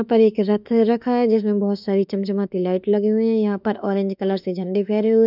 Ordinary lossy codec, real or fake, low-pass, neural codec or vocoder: none; fake; 5.4 kHz; autoencoder, 48 kHz, 32 numbers a frame, DAC-VAE, trained on Japanese speech